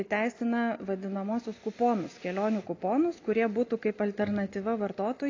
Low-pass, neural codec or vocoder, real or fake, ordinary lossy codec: 7.2 kHz; none; real; AAC, 32 kbps